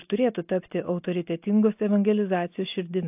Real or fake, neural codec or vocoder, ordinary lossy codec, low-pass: real; none; AAC, 32 kbps; 3.6 kHz